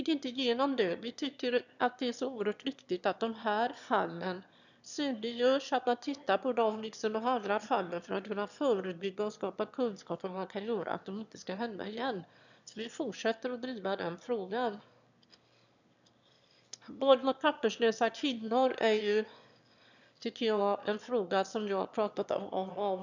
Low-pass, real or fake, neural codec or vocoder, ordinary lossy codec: 7.2 kHz; fake; autoencoder, 22.05 kHz, a latent of 192 numbers a frame, VITS, trained on one speaker; none